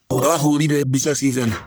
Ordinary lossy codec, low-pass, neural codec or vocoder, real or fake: none; none; codec, 44.1 kHz, 1.7 kbps, Pupu-Codec; fake